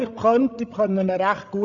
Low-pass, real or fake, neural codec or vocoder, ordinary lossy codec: 7.2 kHz; fake; codec, 16 kHz, 8 kbps, FreqCodec, larger model; none